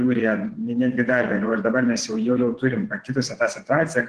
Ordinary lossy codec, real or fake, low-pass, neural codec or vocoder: Opus, 16 kbps; fake; 9.9 kHz; vocoder, 22.05 kHz, 80 mel bands, WaveNeXt